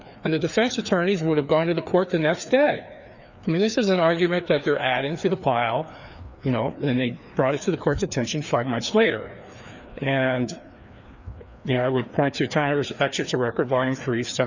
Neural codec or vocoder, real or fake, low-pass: codec, 16 kHz, 2 kbps, FreqCodec, larger model; fake; 7.2 kHz